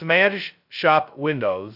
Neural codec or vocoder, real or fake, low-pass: codec, 16 kHz, 0.2 kbps, FocalCodec; fake; 5.4 kHz